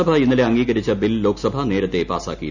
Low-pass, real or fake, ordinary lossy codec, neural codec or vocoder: 7.2 kHz; real; none; none